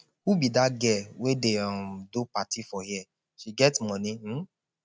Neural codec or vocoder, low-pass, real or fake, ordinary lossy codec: none; none; real; none